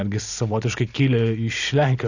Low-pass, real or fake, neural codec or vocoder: 7.2 kHz; real; none